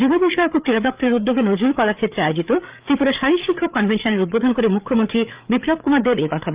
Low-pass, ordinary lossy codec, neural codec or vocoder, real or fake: 3.6 kHz; Opus, 32 kbps; codec, 16 kHz, 8 kbps, FreqCodec, larger model; fake